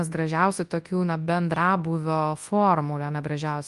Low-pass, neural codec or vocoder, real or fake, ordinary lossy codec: 10.8 kHz; codec, 24 kHz, 0.9 kbps, WavTokenizer, large speech release; fake; Opus, 32 kbps